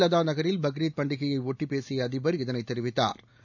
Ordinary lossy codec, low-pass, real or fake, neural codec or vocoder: none; 7.2 kHz; real; none